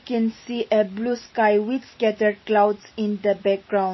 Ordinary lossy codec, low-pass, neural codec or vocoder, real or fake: MP3, 24 kbps; 7.2 kHz; none; real